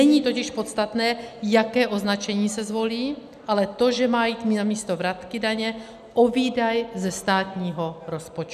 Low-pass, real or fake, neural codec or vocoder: 14.4 kHz; real; none